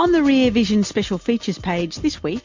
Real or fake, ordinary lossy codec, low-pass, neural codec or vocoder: real; MP3, 48 kbps; 7.2 kHz; none